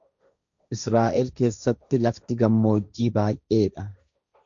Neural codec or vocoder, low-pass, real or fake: codec, 16 kHz, 1.1 kbps, Voila-Tokenizer; 7.2 kHz; fake